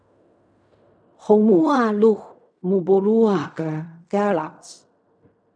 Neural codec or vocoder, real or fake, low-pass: codec, 16 kHz in and 24 kHz out, 0.4 kbps, LongCat-Audio-Codec, fine tuned four codebook decoder; fake; 9.9 kHz